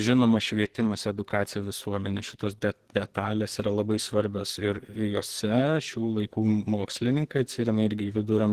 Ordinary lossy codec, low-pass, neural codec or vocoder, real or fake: Opus, 16 kbps; 14.4 kHz; codec, 44.1 kHz, 2.6 kbps, SNAC; fake